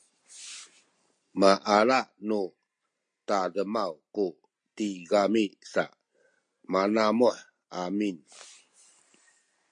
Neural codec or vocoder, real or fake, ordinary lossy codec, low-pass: none; real; MP3, 48 kbps; 9.9 kHz